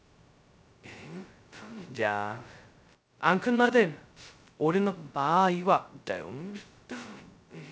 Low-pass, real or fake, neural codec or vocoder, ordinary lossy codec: none; fake; codec, 16 kHz, 0.2 kbps, FocalCodec; none